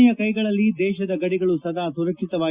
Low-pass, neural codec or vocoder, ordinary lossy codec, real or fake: 3.6 kHz; none; Opus, 64 kbps; real